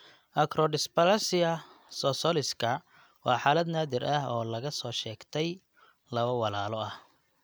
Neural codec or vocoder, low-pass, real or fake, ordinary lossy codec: none; none; real; none